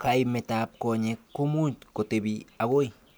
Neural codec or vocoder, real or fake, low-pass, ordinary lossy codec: none; real; none; none